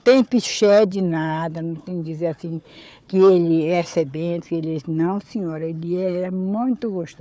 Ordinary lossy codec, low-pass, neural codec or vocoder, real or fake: none; none; codec, 16 kHz, 4 kbps, FunCodec, trained on Chinese and English, 50 frames a second; fake